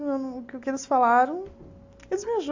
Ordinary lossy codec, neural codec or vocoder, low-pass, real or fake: AAC, 48 kbps; none; 7.2 kHz; real